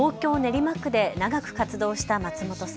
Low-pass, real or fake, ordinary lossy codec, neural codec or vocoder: none; real; none; none